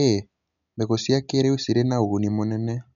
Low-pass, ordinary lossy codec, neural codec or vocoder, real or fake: 7.2 kHz; none; none; real